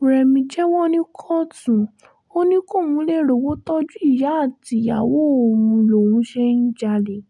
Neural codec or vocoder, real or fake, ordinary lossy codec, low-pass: none; real; none; 10.8 kHz